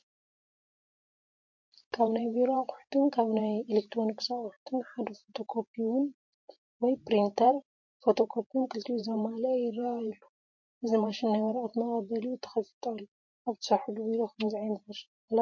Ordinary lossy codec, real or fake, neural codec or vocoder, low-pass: MP3, 48 kbps; fake; vocoder, 44.1 kHz, 128 mel bands every 512 samples, BigVGAN v2; 7.2 kHz